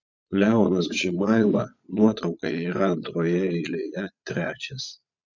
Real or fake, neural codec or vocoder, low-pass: fake; vocoder, 22.05 kHz, 80 mel bands, Vocos; 7.2 kHz